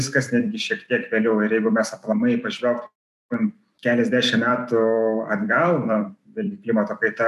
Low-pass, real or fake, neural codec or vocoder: 14.4 kHz; fake; vocoder, 44.1 kHz, 128 mel bands every 256 samples, BigVGAN v2